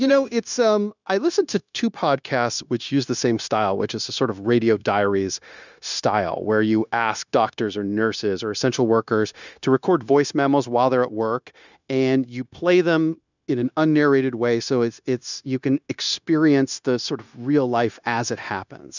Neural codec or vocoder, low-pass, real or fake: codec, 16 kHz, 0.9 kbps, LongCat-Audio-Codec; 7.2 kHz; fake